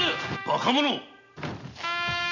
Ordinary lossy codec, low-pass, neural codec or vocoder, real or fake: none; 7.2 kHz; none; real